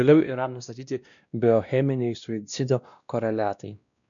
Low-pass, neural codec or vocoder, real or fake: 7.2 kHz; codec, 16 kHz, 1 kbps, X-Codec, WavLM features, trained on Multilingual LibriSpeech; fake